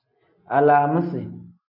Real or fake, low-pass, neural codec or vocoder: real; 5.4 kHz; none